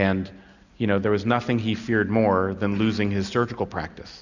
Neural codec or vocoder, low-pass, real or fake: none; 7.2 kHz; real